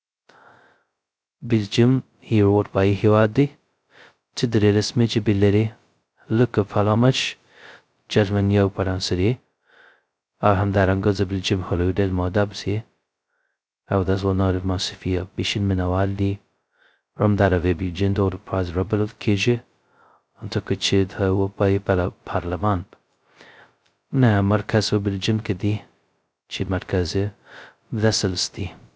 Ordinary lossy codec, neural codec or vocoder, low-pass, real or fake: none; codec, 16 kHz, 0.2 kbps, FocalCodec; none; fake